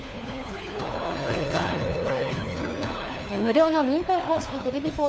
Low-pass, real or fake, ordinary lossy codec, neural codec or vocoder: none; fake; none; codec, 16 kHz, 4 kbps, FunCodec, trained on LibriTTS, 50 frames a second